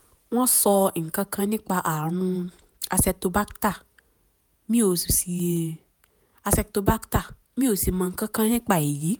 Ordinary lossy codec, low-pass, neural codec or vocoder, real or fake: none; none; vocoder, 48 kHz, 128 mel bands, Vocos; fake